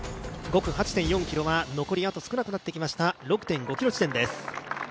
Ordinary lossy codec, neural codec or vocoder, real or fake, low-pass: none; none; real; none